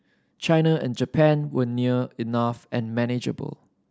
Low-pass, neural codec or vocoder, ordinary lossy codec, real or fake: none; none; none; real